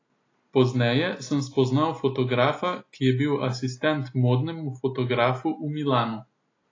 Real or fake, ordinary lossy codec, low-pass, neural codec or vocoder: real; AAC, 32 kbps; 7.2 kHz; none